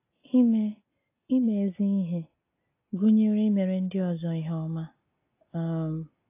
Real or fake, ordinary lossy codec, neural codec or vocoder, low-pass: real; AAC, 24 kbps; none; 3.6 kHz